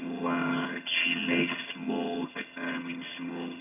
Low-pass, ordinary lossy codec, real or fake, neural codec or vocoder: 3.6 kHz; none; fake; vocoder, 22.05 kHz, 80 mel bands, HiFi-GAN